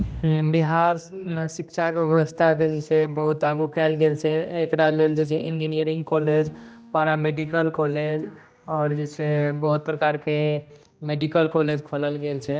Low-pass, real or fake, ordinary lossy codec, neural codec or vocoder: none; fake; none; codec, 16 kHz, 1 kbps, X-Codec, HuBERT features, trained on general audio